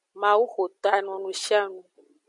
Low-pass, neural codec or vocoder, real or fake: 10.8 kHz; none; real